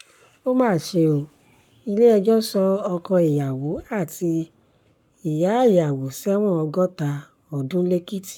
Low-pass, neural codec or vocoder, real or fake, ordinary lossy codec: 19.8 kHz; codec, 44.1 kHz, 7.8 kbps, Pupu-Codec; fake; none